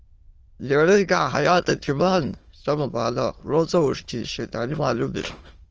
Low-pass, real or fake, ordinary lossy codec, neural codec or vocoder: 7.2 kHz; fake; Opus, 24 kbps; autoencoder, 22.05 kHz, a latent of 192 numbers a frame, VITS, trained on many speakers